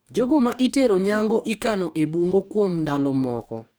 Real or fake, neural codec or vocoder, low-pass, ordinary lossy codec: fake; codec, 44.1 kHz, 2.6 kbps, DAC; none; none